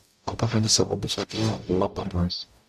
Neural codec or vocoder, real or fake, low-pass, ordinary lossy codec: codec, 44.1 kHz, 0.9 kbps, DAC; fake; 14.4 kHz; none